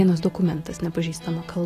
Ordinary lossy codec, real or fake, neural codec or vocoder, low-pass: AAC, 48 kbps; real; none; 14.4 kHz